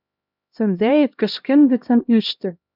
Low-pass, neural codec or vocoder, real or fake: 5.4 kHz; codec, 16 kHz, 1 kbps, X-Codec, HuBERT features, trained on LibriSpeech; fake